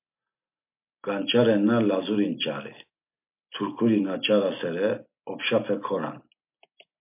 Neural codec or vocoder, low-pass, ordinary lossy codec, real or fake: none; 3.6 kHz; MP3, 32 kbps; real